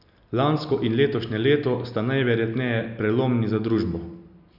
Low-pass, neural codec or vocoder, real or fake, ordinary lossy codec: 5.4 kHz; none; real; none